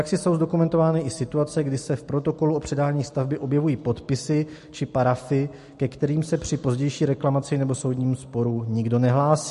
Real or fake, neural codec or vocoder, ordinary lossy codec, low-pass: real; none; MP3, 48 kbps; 14.4 kHz